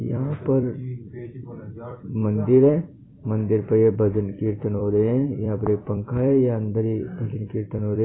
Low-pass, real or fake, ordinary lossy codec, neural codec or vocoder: 7.2 kHz; fake; AAC, 16 kbps; vocoder, 44.1 kHz, 128 mel bands every 256 samples, BigVGAN v2